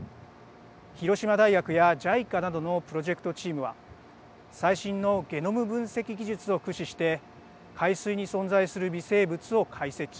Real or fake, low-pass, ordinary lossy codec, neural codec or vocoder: real; none; none; none